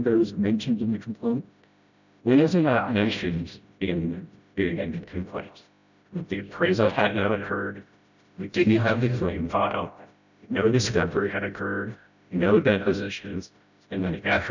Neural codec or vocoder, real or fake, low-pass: codec, 16 kHz, 0.5 kbps, FreqCodec, smaller model; fake; 7.2 kHz